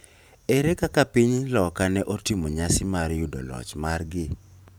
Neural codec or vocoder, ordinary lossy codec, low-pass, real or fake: vocoder, 44.1 kHz, 128 mel bands every 512 samples, BigVGAN v2; none; none; fake